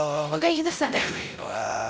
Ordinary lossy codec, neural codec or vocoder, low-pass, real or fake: none; codec, 16 kHz, 0.5 kbps, X-Codec, WavLM features, trained on Multilingual LibriSpeech; none; fake